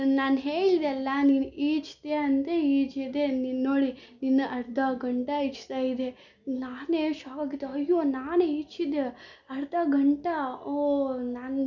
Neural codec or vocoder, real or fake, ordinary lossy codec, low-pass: none; real; none; 7.2 kHz